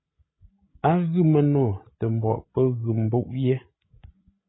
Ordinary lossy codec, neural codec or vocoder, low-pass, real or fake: AAC, 16 kbps; codec, 16 kHz, 16 kbps, FreqCodec, larger model; 7.2 kHz; fake